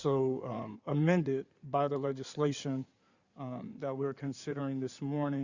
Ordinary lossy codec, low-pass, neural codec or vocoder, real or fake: Opus, 64 kbps; 7.2 kHz; codec, 16 kHz in and 24 kHz out, 2.2 kbps, FireRedTTS-2 codec; fake